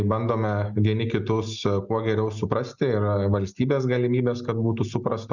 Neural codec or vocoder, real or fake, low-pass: none; real; 7.2 kHz